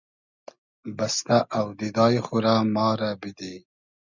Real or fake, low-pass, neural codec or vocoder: real; 7.2 kHz; none